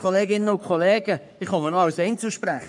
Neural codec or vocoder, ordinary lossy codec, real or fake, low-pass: codec, 44.1 kHz, 3.4 kbps, Pupu-Codec; none; fake; 10.8 kHz